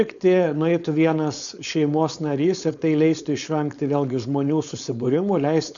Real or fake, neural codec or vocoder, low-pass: fake; codec, 16 kHz, 4.8 kbps, FACodec; 7.2 kHz